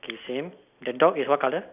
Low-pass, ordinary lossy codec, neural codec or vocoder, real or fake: 3.6 kHz; none; none; real